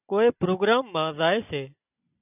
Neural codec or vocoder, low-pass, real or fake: none; 3.6 kHz; real